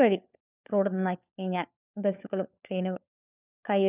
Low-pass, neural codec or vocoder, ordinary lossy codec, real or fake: 3.6 kHz; codec, 16 kHz, 4 kbps, FunCodec, trained on LibriTTS, 50 frames a second; none; fake